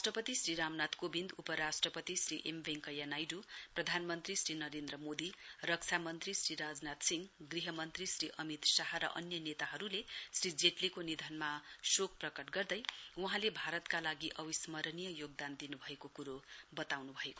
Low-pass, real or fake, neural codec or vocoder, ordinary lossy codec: none; real; none; none